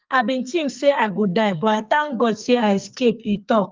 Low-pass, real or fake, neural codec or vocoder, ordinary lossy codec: 7.2 kHz; fake; codec, 44.1 kHz, 2.6 kbps, SNAC; Opus, 32 kbps